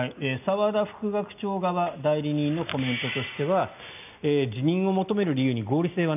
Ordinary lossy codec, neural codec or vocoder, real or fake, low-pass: none; none; real; 3.6 kHz